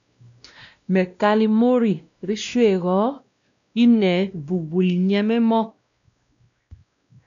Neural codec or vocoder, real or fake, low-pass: codec, 16 kHz, 1 kbps, X-Codec, WavLM features, trained on Multilingual LibriSpeech; fake; 7.2 kHz